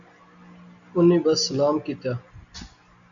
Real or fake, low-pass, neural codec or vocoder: real; 7.2 kHz; none